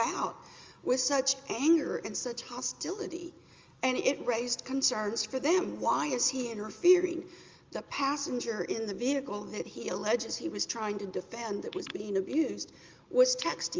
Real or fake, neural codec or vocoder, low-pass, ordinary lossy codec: real; none; 7.2 kHz; Opus, 32 kbps